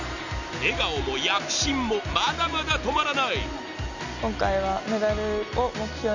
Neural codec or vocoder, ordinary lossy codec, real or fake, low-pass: none; none; real; 7.2 kHz